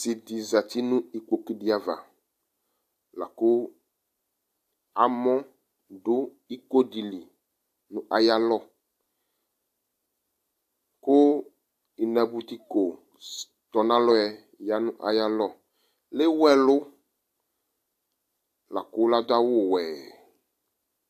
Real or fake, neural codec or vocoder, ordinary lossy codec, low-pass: real; none; AAC, 64 kbps; 14.4 kHz